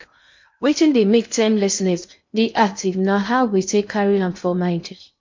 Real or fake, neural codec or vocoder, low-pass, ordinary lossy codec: fake; codec, 16 kHz in and 24 kHz out, 0.6 kbps, FocalCodec, streaming, 2048 codes; 7.2 kHz; MP3, 48 kbps